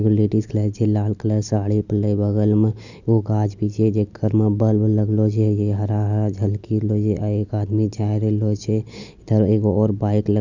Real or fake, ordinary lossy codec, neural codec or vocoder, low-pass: real; none; none; 7.2 kHz